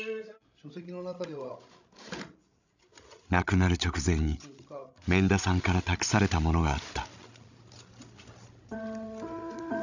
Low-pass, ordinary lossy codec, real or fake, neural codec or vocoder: 7.2 kHz; none; fake; codec, 16 kHz, 16 kbps, FreqCodec, larger model